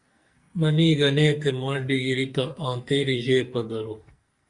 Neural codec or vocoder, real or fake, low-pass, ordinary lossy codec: codec, 44.1 kHz, 2.6 kbps, SNAC; fake; 10.8 kHz; Opus, 64 kbps